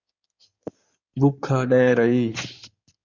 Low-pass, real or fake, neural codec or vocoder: 7.2 kHz; fake; codec, 16 kHz in and 24 kHz out, 2.2 kbps, FireRedTTS-2 codec